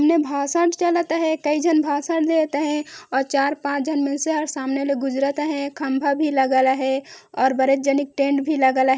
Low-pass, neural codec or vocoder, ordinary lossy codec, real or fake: none; none; none; real